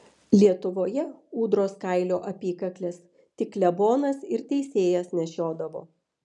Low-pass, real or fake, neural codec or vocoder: 10.8 kHz; real; none